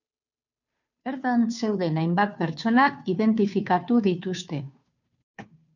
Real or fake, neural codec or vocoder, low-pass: fake; codec, 16 kHz, 2 kbps, FunCodec, trained on Chinese and English, 25 frames a second; 7.2 kHz